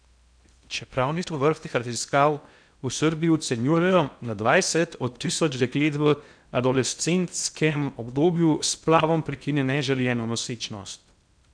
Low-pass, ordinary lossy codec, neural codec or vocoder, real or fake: 9.9 kHz; none; codec, 16 kHz in and 24 kHz out, 0.6 kbps, FocalCodec, streaming, 2048 codes; fake